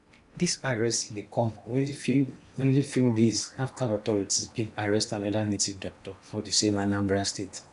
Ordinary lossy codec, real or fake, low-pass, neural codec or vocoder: none; fake; 10.8 kHz; codec, 16 kHz in and 24 kHz out, 0.8 kbps, FocalCodec, streaming, 65536 codes